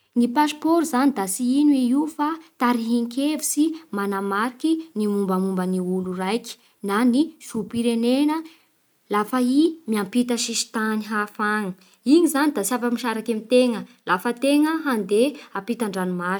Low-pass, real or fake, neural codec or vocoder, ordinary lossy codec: none; real; none; none